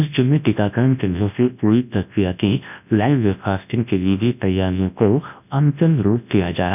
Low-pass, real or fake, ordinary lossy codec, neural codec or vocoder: 3.6 kHz; fake; none; codec, 24 kHz, 0.9 kbps, WavTokenizer, large speech release